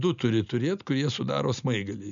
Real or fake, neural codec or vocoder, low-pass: real; none; 7.2 kHz